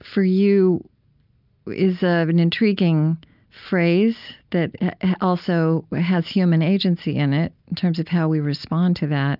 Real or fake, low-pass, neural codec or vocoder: real; 5.4 kHz; none